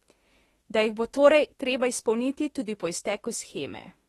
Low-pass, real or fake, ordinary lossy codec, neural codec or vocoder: 19.8 kHz; fake; AAC, 32 kbps; autoencoder, 48 kHz, 32 numbers a frame, DAC-VAE, trained on Japanese speech